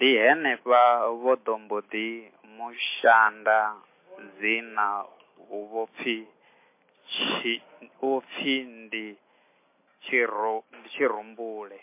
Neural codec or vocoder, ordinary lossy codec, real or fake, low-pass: none; MP3, 24 kbps; real; 3.6 kHz